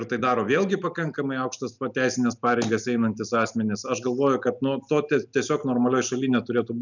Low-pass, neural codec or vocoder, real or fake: 7.2 kHz; none; real